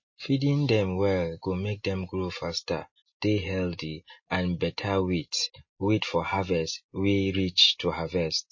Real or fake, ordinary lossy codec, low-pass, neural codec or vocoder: real; MP3, 32 kbps; 7.2 kHz; none